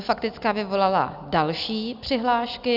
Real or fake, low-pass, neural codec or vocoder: real; 5.4 kHz; none